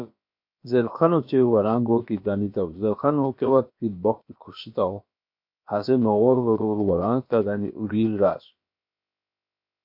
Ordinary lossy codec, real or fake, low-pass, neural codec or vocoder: MP3, 32 kbps; fake; 5.4 kHz; codec, 16 kHz, about 1 kbps, DyCAST, with the encoder's durations